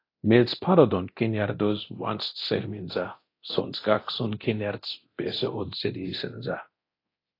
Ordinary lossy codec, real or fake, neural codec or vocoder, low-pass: AAC, 32 kbps; fake; codec, 24 kHz, 0.9 kbps, DualCodec; 5.4 kHz